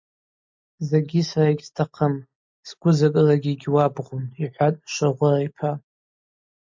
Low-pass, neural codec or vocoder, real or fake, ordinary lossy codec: 7.2 kHz; none; real; MP3, 48 kbps